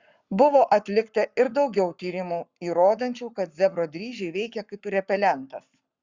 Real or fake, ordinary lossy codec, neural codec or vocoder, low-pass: fake; Opus, 64 kbps; codec, 44.1 kHz, 7.8 kbps, Pupu-Codec; 7.2 kHz